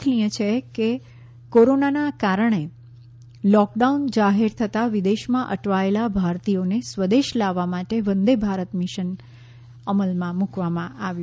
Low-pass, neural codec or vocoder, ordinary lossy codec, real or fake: none; none; none; real